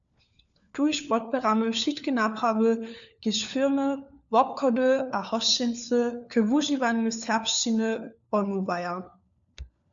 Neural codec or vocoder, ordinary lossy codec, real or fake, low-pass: codec, 16 kHz, 4 kbps, FunCodec, trained on LibriTTS, 50 frames a second; MP3, 96 kbps; fake; 7.2 kHz